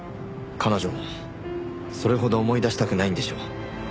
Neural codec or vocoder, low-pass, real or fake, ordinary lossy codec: none; none; real; none